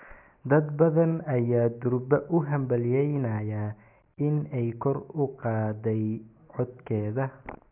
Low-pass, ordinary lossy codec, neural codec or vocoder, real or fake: 3.6 kHz; none; none; real